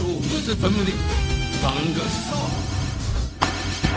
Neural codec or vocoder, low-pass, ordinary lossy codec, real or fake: codec, 16 kHz, 0.4 kbps, LongCat-Audio-Codec; none; none; fake